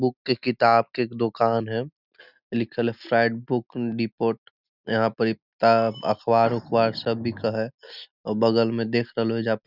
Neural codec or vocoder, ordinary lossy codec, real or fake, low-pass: none; none; real; 5.4 kHz